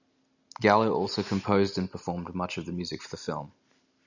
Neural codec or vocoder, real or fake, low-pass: none; real; 7.2 kHz